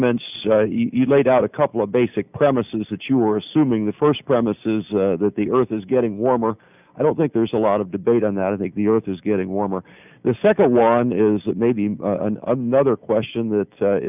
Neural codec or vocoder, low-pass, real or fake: none; 3.6 kHz; real